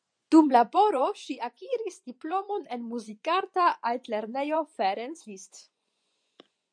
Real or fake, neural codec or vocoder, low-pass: fake; vocoder, 24 kHz, 100 mel bands, Vocos; 9.9 kHz